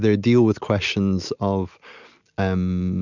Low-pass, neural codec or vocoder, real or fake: 7.2 kHz; none; real